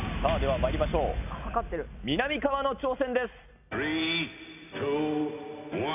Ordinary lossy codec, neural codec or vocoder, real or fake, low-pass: none; none; real; 3.6 kHz